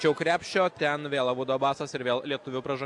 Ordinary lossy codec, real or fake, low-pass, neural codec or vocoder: AAC, 64 kbps; real; 10.8 kHz; none